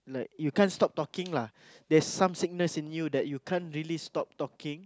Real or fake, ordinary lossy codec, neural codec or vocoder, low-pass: real; none; none; none